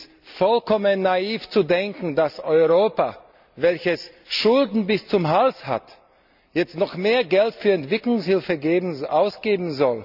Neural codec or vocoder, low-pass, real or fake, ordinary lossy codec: none; 5.4 kHz; real; none